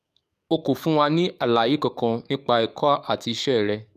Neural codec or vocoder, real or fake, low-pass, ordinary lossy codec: codec, 44.1 kHz, 7.8 kbps, DAC; fake; 14.4 kHz; none